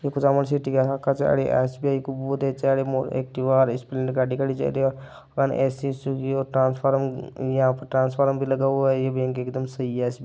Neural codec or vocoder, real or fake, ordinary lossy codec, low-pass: none; real; none; none